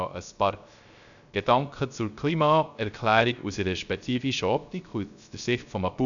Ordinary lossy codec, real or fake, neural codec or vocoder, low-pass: none; fake; codec, 16 kHz, 0.3 kbps, FocalCodec; 7.2 kHz